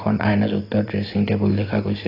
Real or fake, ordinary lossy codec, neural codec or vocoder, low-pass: real; AAC, 24 kbps; none; 5.4 kHz